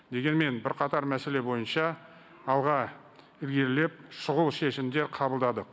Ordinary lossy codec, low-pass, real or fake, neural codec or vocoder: none; none; real; none